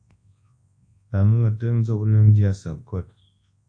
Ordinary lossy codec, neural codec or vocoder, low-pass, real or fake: AAC, 48 kbps; codec, 24 kHz, 0.9 kbps, WavTokenizer, large speech release; 9.9 kHz; fake